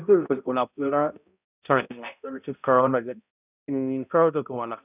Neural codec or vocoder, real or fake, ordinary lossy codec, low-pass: codec, 16 kHz, 0.5 kbps, X-Codec, HuBERT features, trained on balanced general audio; fake; none; 3.6 kHz